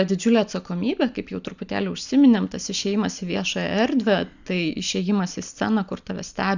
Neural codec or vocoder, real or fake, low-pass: none; real; 7.2 kHz